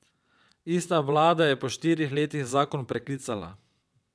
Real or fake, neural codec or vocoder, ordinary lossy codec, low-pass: fake; vocoder, 22.05 kHz, 80 mel bands, WaveNeXt; none; none